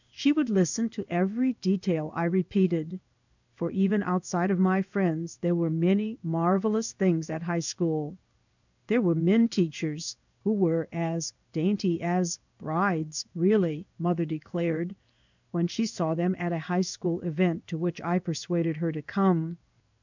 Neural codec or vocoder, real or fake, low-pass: codec, 16 kHz in and 24 kHz out, 1 kbps, XY-Tokenizer; fake; 7.2 kHz